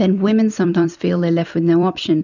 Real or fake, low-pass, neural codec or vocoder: fake; 7.2 kHz; vocoder, 44.1 kHz, 128 mel bands every 256 samples, BigVGAN v2